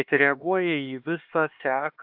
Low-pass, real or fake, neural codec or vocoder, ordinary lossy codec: 5.4 kHz; fake; codec, 16 kHz, 2 kbps, X-Codec, HuBERT features, trained on LibriSpeech; AAC, 48 kbps